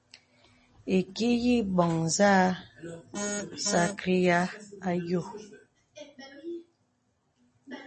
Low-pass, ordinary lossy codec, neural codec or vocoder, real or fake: 10.8 kHz; MP3, 32 kbps; none; real